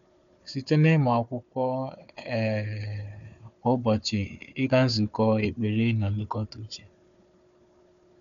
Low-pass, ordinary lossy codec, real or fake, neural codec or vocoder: 7.2 kHz; none; fake; codec, 16 kHz, 4 kbps, FunCodec, trained on Chinese and English, 50 frames a second